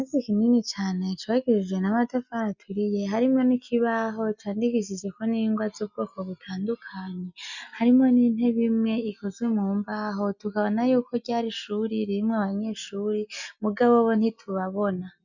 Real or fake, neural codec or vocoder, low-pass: real; none; 7.2 kHz